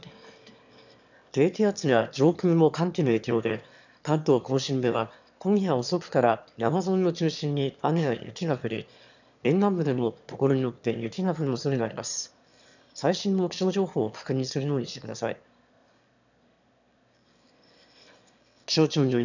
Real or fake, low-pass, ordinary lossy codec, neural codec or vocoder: fake; 7.2 kHz; none; autoencoder, 22.05 kHz, a latent of 192 numbers a frame, VITS, trained on one speaker